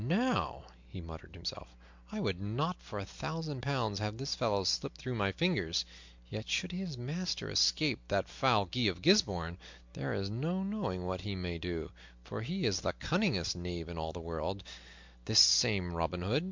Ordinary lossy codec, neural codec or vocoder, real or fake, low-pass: MP3, 64 kbps; none; real; 7.2 kHz